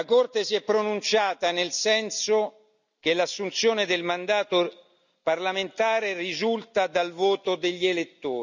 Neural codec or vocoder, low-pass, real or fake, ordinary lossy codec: none; 7.2 kHz; real; none